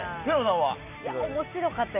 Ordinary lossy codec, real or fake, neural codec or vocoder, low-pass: none; real; none; 3.6 kHz